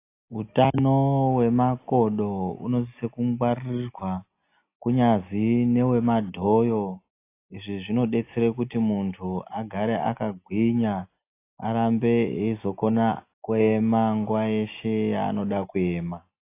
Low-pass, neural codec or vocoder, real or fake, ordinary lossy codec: 3.6 kHz; none; real; AAC, 24 kbps